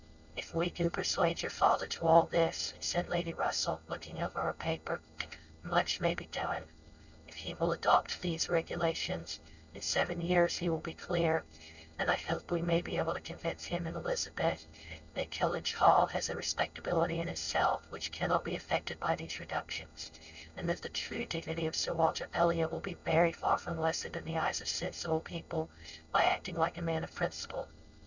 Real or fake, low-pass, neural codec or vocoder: fake; 7.2 kHz; codec, 16 kHz, 4.8 kbps, FACodec